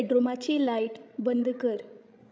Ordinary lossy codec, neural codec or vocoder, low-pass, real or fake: none; codec, 16 kHz, 16 kbps, FreqCodec, larger model; none; fake